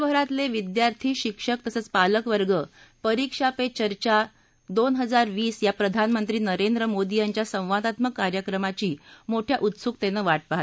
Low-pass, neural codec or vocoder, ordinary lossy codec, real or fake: none; none; none; real